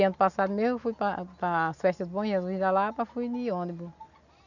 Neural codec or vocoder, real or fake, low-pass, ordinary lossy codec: none; real; 7.2 kHz; none